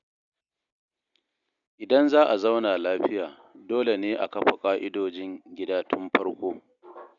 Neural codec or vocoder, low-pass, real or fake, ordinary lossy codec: none; 7.2 kHz; real; none